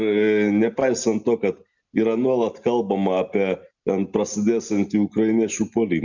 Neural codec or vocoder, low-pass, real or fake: none; 7.2 kHz; real